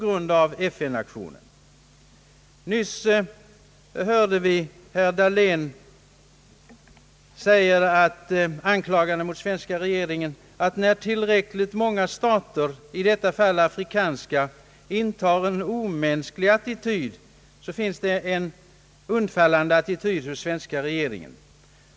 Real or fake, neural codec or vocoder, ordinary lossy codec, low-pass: real; none; none; none